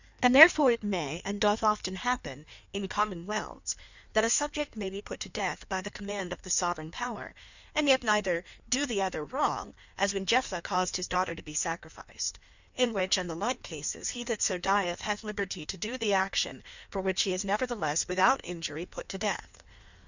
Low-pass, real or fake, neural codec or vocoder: 7.2 kHz; fake; codec, 16 kHz in and 24 kHz out, 1.1 kbps, FireRedTTS-2 codec